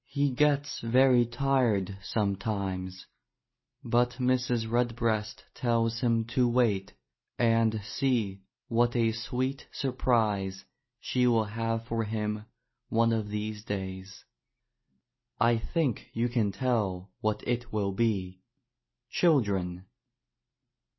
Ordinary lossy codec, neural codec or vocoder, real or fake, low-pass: MP3, 24 kbps; none; real; 7.2 kHz